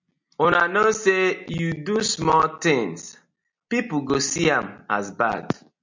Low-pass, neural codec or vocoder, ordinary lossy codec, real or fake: 7.2 kHz; none; MP3, 64 kbps; real